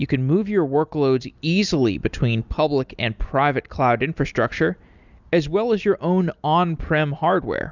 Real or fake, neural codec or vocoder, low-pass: real; none; 7.2 kHz